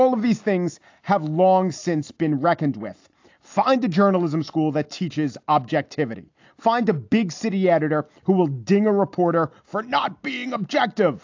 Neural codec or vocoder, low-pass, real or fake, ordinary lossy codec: none; 7.2 kHz; real; AAC, 48 kbps